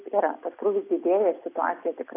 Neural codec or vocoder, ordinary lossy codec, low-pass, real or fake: none; AAC, 24 kbps; 3.6 kHz; real